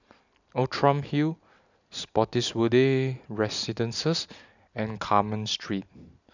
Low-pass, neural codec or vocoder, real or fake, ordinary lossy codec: 7.2 kHz; none; real; none